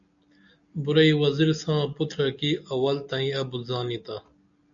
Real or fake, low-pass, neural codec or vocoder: real; 7.2 kHz; none